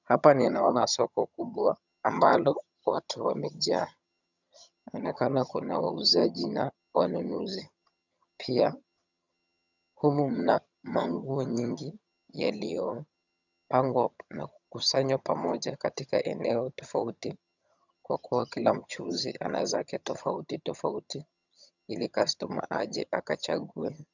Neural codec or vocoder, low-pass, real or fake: vocoder, 22.05 kHz, 80 mel bands, HiFi-GAN; 7.2 kHz; fake